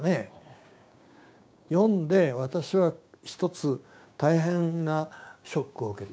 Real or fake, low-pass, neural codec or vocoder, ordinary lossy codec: fake; none; codec, 16 kHz, 6 kbps, DAC; none